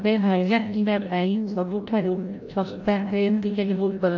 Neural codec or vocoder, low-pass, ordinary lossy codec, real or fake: codec, 16 kHz, 0.5 kbps, FreqCodec, larger model; 7.2 kHz; AAC, 48 kbps; fake